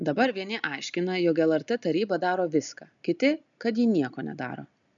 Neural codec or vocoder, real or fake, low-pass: none; real; 7.2 kHz